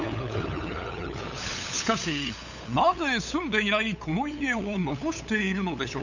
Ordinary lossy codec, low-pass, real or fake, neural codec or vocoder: none; 7.2 kHz; fake; codec, 16 kHz, 8 kbps, FunCodec, trained on LibriTTS, 25 frames a second